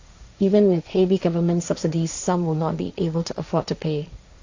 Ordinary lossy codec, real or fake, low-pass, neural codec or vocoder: AAC, 48 kbps; fake; 7.2 kHz; codec, 16 kHz, 1.1 kbps, Voila-Tokenizer